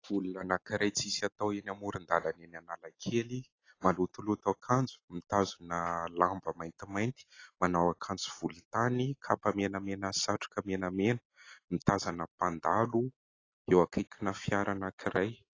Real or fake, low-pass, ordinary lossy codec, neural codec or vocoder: real; 7.2 kHz; AAC, 32 kbps; none